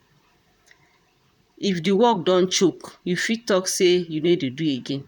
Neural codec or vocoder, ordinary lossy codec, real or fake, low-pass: vocoder, 44.1 kHz, 128 mel bands, Pupu-Vocoder; none; fake; 19.8 kHz